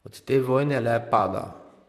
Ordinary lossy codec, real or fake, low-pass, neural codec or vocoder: MP3, 96 kbps; fake; 14.4 kHz; vocoder, 44.1 kHz, 128 mel bands, Pupu-Vocoder